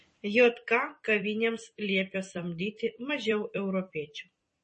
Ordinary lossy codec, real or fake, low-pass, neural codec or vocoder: MP3, 32 kbps; fake; 10.8 kHz; vocoder, 44.1 kHz, 128 mel bands every 256 samples, BigVGAN v2